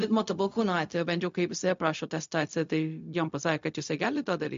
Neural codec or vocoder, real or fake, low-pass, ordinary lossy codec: codec, 16 kHz, 0.4 kbps, LongCat-Audio-Codec; fake; 7.2 kHz; MP3, 48 kbps